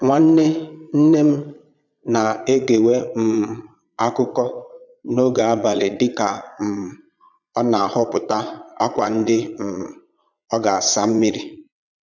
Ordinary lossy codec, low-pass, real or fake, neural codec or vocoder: none; 7.2 kHz; fake; vocoder, 22.05 kHz, 80 mel bands, Vocos